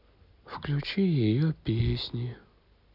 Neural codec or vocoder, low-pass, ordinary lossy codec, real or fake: none; 5.4 kHz; none; real